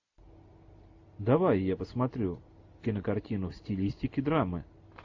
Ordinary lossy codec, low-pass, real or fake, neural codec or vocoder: AAC, 48 kbps; 7.2 kHz; real; none